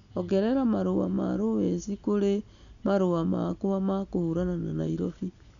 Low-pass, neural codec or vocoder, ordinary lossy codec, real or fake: 7.2 kHz; none; none; real